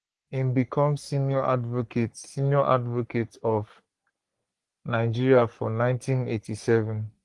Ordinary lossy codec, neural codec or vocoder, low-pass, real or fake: Opus, 24 kbps; codec, 44.1 kHz, 3.4 kbps, Pupu-Codec; 10.8 kHz; fake